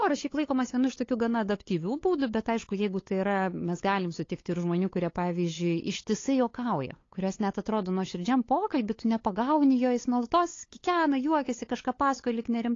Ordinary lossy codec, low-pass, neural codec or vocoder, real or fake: AAC, 32 kbps; 7.2 kHz; codec, 16 kHz, 8 kbps, FunCodec, trained on LibriTTS, 25 frames a second; fake